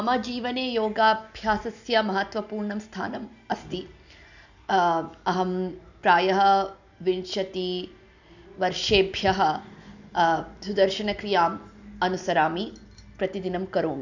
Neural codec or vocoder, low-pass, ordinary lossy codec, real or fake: none; 7.2 kHz; none; real